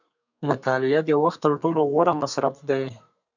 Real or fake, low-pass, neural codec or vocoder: fake; 7.2 kHz; codec, 32 kHz, 1.9 kbps, SNAC